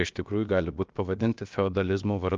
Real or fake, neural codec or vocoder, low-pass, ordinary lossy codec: fake; codec, 16 kHz, about 1 kbps, DyCAST, with the encoder's durations; 7.2 kHz; Opus, 24 kbps